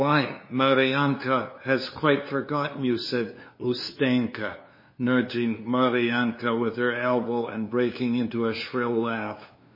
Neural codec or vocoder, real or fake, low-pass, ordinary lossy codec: codec, 16 kHz, 2 kbps, FunCodec, trained on LibriTTS, 25 frames a second; fake; 5.4 kHz; MP3, 24 kbps